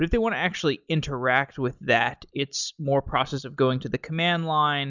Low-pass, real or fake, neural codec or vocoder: 7.2 kHz; real; none